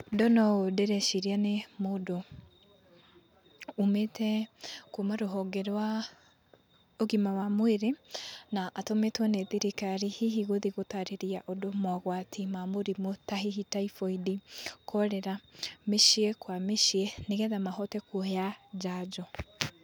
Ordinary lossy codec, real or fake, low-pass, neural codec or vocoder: none; real; none; none